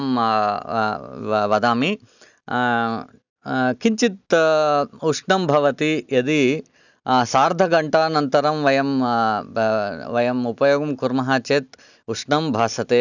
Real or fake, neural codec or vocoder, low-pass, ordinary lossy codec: real; none; 7.2 kHz; none